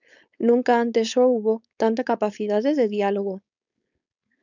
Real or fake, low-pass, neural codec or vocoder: fake; 7.2 kHz; codec, 16 kHz, 4.8 kbps, FACodec